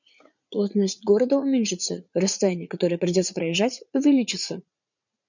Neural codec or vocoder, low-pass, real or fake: vocoder, 44.1 kHz, 80 mel bands, Vocos; 7.2 kHz; fake